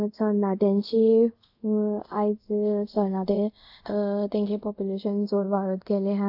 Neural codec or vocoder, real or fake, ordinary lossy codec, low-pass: codec, 24 kHz, 0.5 kbps, DualCodec; fake; AAC, 48 kbps; 5.4 kHz